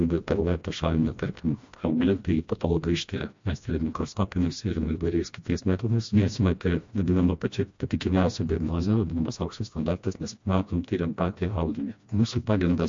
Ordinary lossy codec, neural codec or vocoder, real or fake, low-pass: MP3, 48 kbps; codec, 16 kHz, 1 kbps, FreqCodec, smaller model; fake; 7.2 kHz